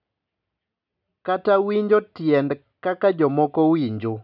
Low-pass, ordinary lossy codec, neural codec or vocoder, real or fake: 5.4 kHz; none; none; real